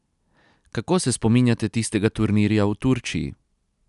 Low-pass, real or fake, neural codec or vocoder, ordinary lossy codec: 10.8 kHz; real; none; none